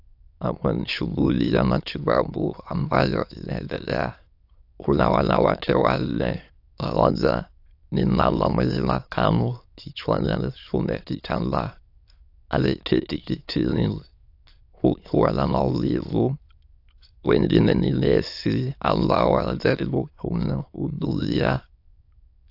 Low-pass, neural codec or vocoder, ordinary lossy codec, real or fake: 5.4 kHz; autoencoder, 22.05 kHz, a latent of 192 numbers a frame, VITS, trained on many speakers; AAC, 48 kbps; fake